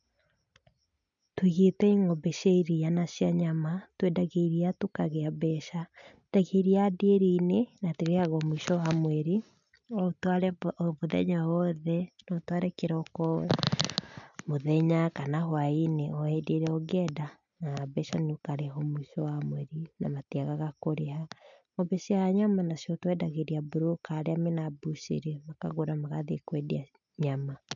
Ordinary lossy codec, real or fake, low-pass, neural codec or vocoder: none; real; 7.2 kHz; none